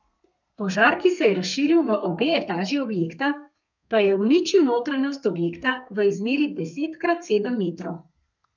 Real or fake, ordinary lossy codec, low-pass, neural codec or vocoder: fake; none; 7.2 kHz; codec, 32 kHz, 1.9 kbps, SNAC